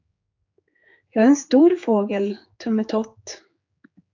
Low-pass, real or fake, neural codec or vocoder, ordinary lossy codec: 7.2 kHz; fake; codec, 16 kHz, 4 kbps, X-Codec, HuBERT features, trained on general audio; AAC, 48 kbps